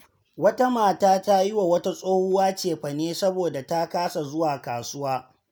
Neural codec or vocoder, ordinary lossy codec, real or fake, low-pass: none; none; real; none